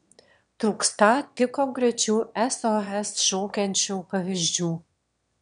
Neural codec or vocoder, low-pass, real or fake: autoencoder, 22.05 kHz, a latent of 192 numbers a frame, VITS, trained on one speaker; 9.9 kHz; fake